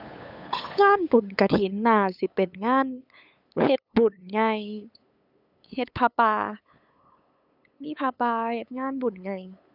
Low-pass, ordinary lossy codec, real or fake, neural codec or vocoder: 5.4 kHz; none; fake; codec, 16 kHz, 8 kbps, FunCodec, trained on LibriTTS, 25 frames a second